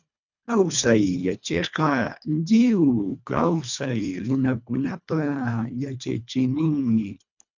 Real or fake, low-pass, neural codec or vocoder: fake; 7.2 kHz; codec, 24 kHz, 1.5 kbps, HILCodec